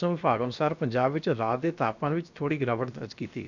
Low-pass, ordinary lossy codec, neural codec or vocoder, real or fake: 7.2 kHz; none; codec, 16 kHz, about 1 kbps, DyCAST, with the encoder's durations; fake